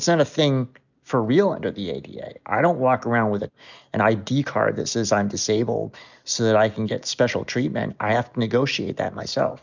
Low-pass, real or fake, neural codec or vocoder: 7.2 kHz; real; none